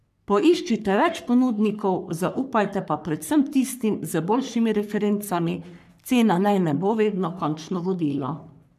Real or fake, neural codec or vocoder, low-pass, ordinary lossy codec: fake; codec, 44.1 kHz, 3.4 kbps, Pupu-Codec; 14.4 kHz; none